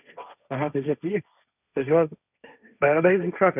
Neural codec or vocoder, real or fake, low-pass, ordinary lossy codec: codec, 16 kHz, 1.1 kbps, Voila-Tokenizer; fake; 3.6 kHz; none